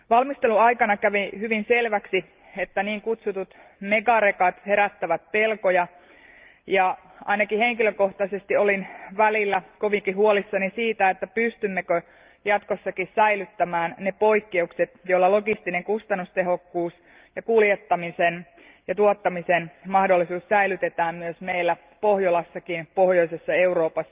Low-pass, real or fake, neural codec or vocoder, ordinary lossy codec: 3.6 kHz; real; none; Opus, 32 kbps